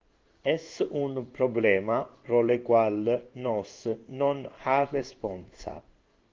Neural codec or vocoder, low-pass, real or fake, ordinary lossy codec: codec, 16 kHz in and 24 kHz out, 1 kbps, XY-Tokenizer; 7.2 kHz; fake; Opus, 32 kbps